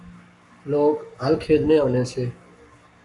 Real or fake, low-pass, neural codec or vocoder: fake; 10.8 kHz; codec, 44.1 kHz, 7.8 kbps, Pupu-Codec